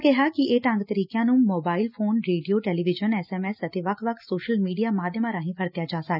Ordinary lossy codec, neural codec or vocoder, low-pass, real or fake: none; none; 5.4 kHz; real